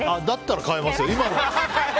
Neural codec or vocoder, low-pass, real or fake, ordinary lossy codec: none; none; real; none